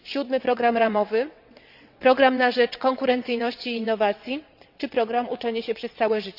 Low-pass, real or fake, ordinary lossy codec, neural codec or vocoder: 5.4 kHz; fake; Opus, 64 kbps; vocoder, 22.05 kHz, 80 mel bands, WaveNeXt